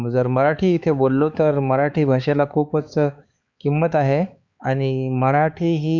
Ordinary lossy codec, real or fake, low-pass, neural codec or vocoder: Opus, 64 kbps; fake; 7.2 kHz; codec, 16 kHz, 4 kbps, X-Codec, HuBERT features, trained on balanced general audio